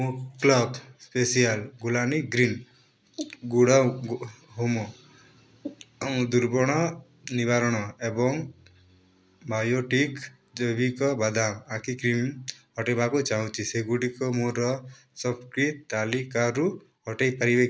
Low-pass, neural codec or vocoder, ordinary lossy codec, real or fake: none; none; none; real